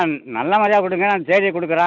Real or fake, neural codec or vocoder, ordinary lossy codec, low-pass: real; none; none; 7.2 kHz